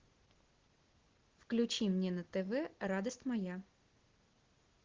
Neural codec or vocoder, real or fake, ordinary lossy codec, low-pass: none; real; Opus, 16 kbps; 7.2 kHz